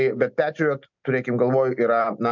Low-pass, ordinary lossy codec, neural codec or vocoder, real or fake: 7.2 kHz; MP3, 64 kbps; none; real